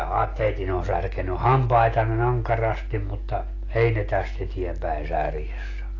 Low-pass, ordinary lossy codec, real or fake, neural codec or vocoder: 7.2 kHz; AAC, 32 kbps; real; none